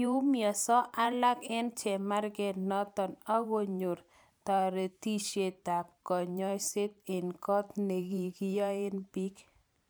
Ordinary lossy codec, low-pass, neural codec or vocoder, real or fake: none; none; vocoder, 44.1 kHz, 128 mel bands every 512 samples, BigVGAN v2; fake